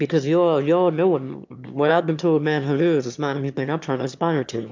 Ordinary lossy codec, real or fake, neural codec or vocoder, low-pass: MP3, 64 kbps; fake; autoencoder, 22.05 kHz, a latent of 192 numbers a frame, VITS, trained on one speaker; 7.2 kHz